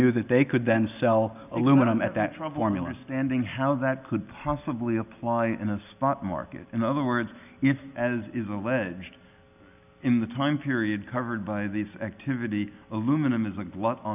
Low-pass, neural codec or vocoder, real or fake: 3.6 kHz; none; real